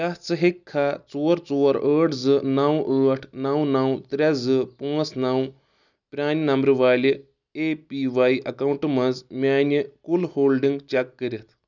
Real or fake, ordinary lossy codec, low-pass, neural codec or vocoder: real; none; 7.2 kHz; none